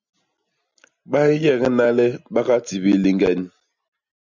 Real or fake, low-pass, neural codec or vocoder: real; 7.2 kHz; none